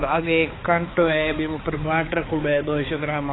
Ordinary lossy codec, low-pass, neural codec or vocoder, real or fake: AAC, 16 kbps; 7.2 kHz; codec, 16 kHz, 4 kbps, X-Codec, HuBERT features, trained on balanced general audio; fake